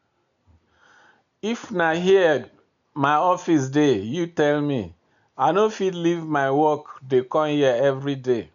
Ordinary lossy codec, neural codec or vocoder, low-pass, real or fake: none; none; 7.2 kHz; real